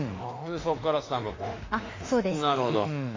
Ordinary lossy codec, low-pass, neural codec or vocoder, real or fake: AAC, 32 kbps; 7.2 kHz; autoencoder, 48 kHz, 32 numbers a frame, DAC-VAE, trained on Japanese speech; fake